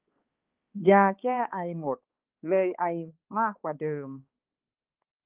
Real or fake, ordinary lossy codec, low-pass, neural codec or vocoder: fake; Opus, 32 kbps; 3.6 kHz; codec, 16 kHz, 2 kbps, X-Codec, HuBERT features, trained on balanced general audio